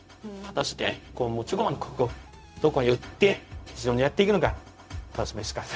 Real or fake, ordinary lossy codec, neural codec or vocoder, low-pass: fake; none; codec, 16 kHz, 0.4 kbps, LongCat-Audio-Codec; none